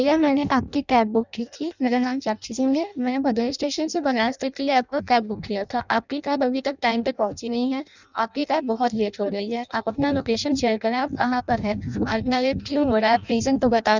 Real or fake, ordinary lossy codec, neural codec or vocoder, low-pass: fake; none; codec, 16 kHz in and 24 kHz out, 0.6 kbps, FireRedTTS-2 codec; 7.2 kHz